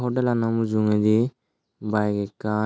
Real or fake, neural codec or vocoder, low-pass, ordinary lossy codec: real; none; none; none